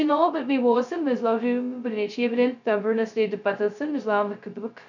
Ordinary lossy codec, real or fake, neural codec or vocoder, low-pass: none; fake; codec, 16 kHz, 0.2 kbps, FocalCodec; 7.2 kHz